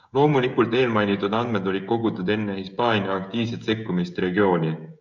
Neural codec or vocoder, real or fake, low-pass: codec, 16 kHz, 16 kbps, FreqCodec, smaller model; fake; 7.2 kHz